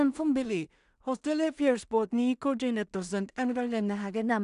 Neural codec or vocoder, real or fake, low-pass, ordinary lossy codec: codec, 16 kHz in and 24 kHz out, 0.4 kbps, LongCat-Audio-Codec, two codebook decoder; fake; 10.8 kHz; none